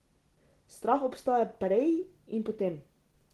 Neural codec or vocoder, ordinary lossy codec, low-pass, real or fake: none; Opus, 16 kbps; 14.4 kHz; real